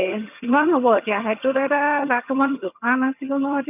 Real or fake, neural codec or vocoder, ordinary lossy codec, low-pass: fake; vocoder, 22.05 kHz, 80 mel bands, HiFi-GAN; none; 3.6 kHz